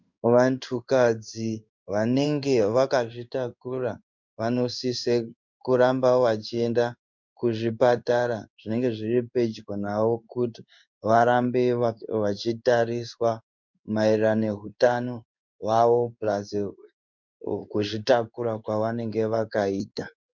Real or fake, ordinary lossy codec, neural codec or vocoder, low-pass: fake; MP3, 64 kbps; codec, 16 kHz in and 24 kHz out, 1 kbps, XY-Tokenizer; 7.2 kHz